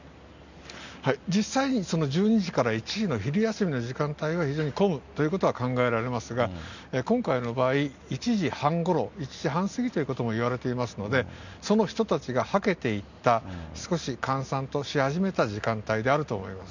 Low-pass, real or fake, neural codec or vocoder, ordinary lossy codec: 7.2 kHz; real; none; none